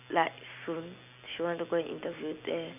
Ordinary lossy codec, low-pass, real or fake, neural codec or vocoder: none; 3.6 kHz; real; none